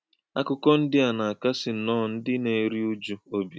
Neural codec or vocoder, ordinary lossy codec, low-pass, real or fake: none; none; none; real